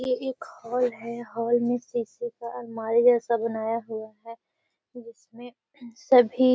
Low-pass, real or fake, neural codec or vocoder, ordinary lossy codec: none; real; none; none